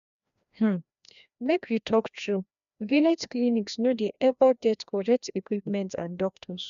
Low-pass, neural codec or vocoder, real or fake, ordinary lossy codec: 7.2 kHz; codec, 16 kHz, 1 kbps, FreqCodec, larger model; fake; none